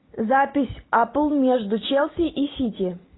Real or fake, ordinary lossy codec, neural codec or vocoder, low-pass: real; AAC, 16 kbps; none; 7.2 kHz